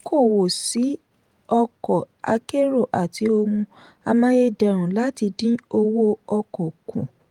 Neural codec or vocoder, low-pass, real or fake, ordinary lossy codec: vocoder, 48 kHz, 128 mel bands, Vocos; 19.8 kHz; fake; Opus, 32 kbps